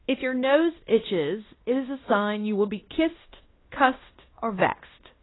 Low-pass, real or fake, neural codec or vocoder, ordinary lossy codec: 7.2 kHz; fake; codec, 16 kHz in and 24 kHz out, 0.9 kbps, LongCat-Audio-Codec, fine tuned four codebook decoder; AAC, 16 kbps